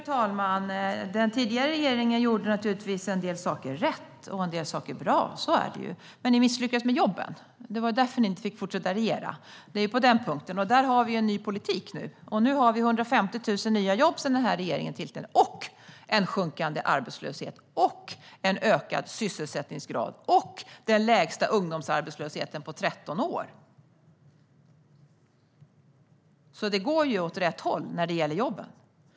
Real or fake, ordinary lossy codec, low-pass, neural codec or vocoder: real; none; none; none